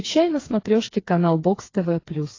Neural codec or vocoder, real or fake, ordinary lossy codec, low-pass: codec, 16 kHz, 4 kbps, FreqCodec, smaller model; fake; AAC, 32 kbps; 7.2 kHz